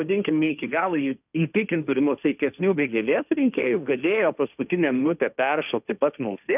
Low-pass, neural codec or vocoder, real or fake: 3.6 kHz; codec, 16 kHz, 1.1 kbps, Voila-Tokenizer; fake